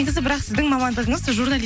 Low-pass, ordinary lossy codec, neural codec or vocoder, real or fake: none; none; none; real